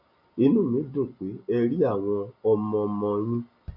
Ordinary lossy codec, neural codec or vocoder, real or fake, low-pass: none; none; real; 5.4 kHz